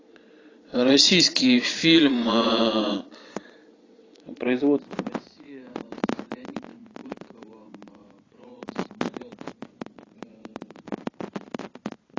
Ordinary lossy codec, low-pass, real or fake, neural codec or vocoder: AAC, 32 kbps; 7.2 kHz; fake; vocoder, 22.05 kHz, 80 mel bands, Vocos